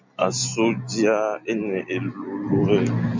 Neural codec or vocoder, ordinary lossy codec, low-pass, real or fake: none; MP3, 64 kbps; 7.2 kHz; real